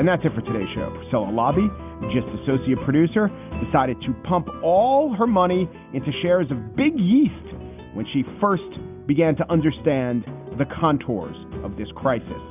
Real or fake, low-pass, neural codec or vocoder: real; 3.6 kHz; none